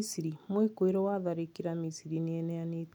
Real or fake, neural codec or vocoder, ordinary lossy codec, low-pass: real; none; none; 19.8 kHz